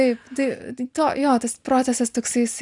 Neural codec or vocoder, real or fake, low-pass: none; real; 10.8 kHz